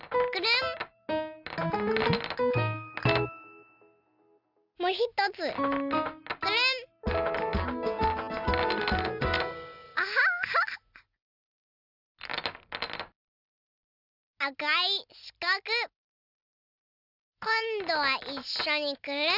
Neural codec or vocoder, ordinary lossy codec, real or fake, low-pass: none; none; real; 5.4 kHz